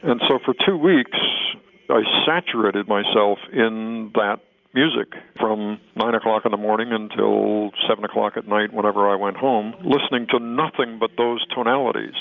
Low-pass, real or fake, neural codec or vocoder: 7.2 kHz; real; none